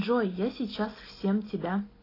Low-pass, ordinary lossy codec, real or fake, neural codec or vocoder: 5.4 kHz; AAC, 24 kbps; real; none